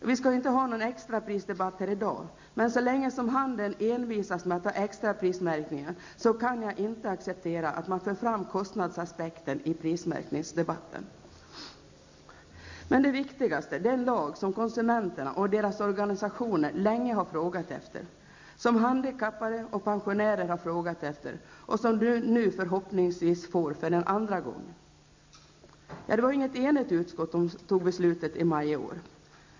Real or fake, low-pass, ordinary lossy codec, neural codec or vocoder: real; 7.2 kHz; MP3, 64 kbps; none